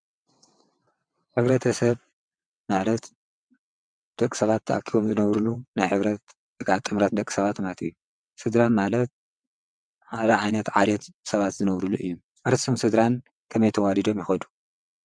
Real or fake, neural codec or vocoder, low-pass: fake; vocoder, 22.05 kHz, 80 mel bands, WaveNeXt; 9.9 kHz